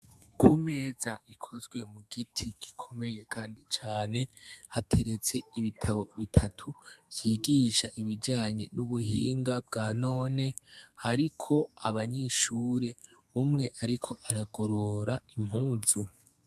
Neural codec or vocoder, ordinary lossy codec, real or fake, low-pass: codec, 32 kHz, 1.9 kbps, SNAC; AAC, 96 kbps; fake; 14.4 kHz